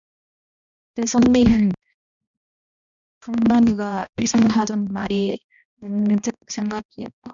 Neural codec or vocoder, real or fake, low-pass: codec, 16 kHz, 1 kbps, X-Codec, HuBERT features, trained on balanced general audio; fake; 7.2 kHz